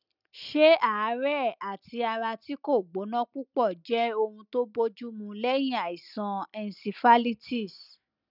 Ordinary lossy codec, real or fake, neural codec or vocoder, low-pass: none; real; none; 5.4 kHz